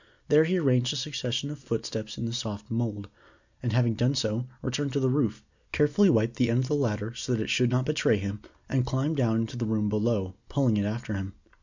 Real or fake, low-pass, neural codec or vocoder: real; 7.2 kHz; none